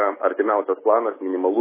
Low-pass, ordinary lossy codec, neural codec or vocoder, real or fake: 3.6 kHz; MP3, 16 kbps; none; real